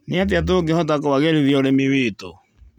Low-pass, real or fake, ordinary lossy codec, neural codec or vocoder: 19.8 kHz; real; none; none